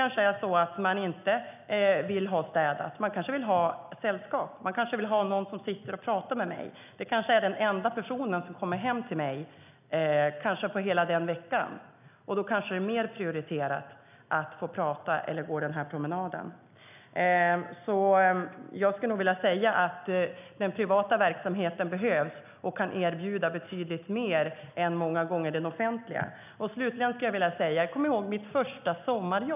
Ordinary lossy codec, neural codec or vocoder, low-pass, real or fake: none; none; 3.6 kHz; real